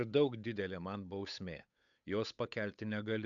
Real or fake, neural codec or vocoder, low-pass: fake; codec, 16 kHz, 8 kbps, FunCodec, trained on Chinese and English, 25 frames a second; 7.2 kHz